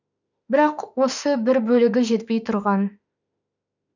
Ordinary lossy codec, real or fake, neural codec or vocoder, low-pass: none; fake; autoencoder, 48 kHz, 32 numbers a frame, DAC-VAE, trained on Japanese speech; 7.2 kHz